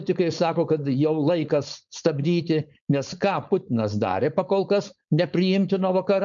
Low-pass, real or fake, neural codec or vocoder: 7.2 kHz; fake; codec, 16 kHz, 4.8 kbps, FACodec